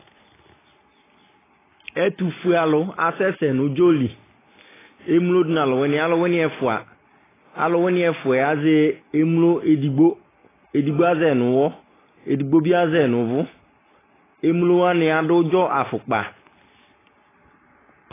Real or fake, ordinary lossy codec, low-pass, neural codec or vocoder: real; AAC, 16 kbps; 3.6 kHz; none